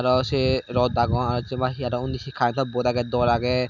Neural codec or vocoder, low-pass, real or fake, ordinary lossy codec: none; 7.2 kHz; real; none